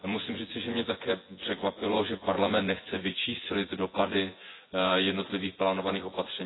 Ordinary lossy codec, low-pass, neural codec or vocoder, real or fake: AAC, 16 kbps; 7.2 kHz; vocoder, 24 kHz, 100 mel bands, Vocos; fake